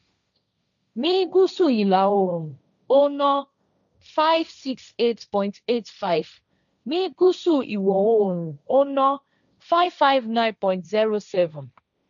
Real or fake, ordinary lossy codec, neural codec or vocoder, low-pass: fake; none; codec, 16 kHz, 1.1 kbps, Voila-Tokenizer; 7.2 kHz